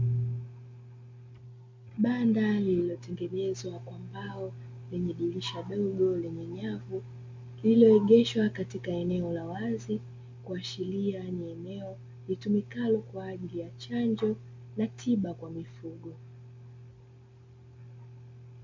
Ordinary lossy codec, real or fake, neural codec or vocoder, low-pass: MP3, 48 kbps; real; none; 7.2 kHz